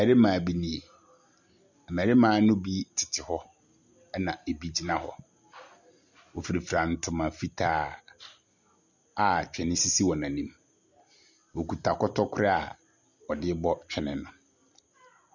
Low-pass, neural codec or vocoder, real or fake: 7.2 kHz; none; real